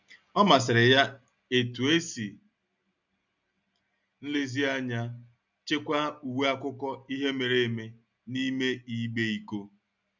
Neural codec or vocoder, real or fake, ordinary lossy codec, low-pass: none; real; none; 7.2 kHz